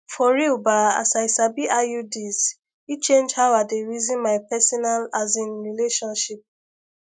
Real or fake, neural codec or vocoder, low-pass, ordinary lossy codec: real; none; none; none